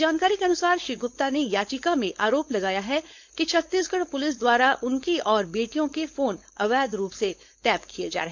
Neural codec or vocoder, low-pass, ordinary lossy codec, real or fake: codec, 16 kHz, 4.8 kbps, FACodec; 7.2 kHz; MP3, 64 kbps; fake